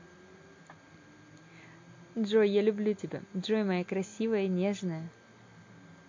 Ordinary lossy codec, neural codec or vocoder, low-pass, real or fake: MP3, 48 kbps; none; 7.2 kHz; real